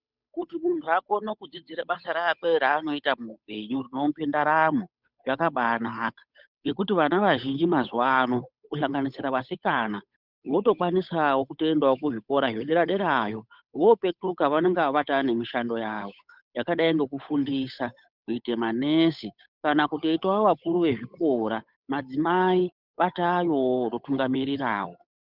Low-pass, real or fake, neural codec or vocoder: 5.4 kHz; fake; codec, 16 kHz, 8 kbps, FunCodec, trained on Chinese and English, 25 frames a second